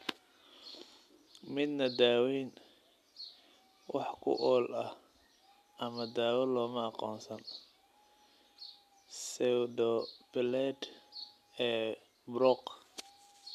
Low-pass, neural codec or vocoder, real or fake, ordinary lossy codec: 14.4 kHz; none; real; none